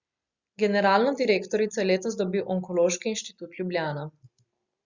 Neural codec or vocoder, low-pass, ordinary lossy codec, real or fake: none; 7.2 kHz; Opus, 64 kbps; real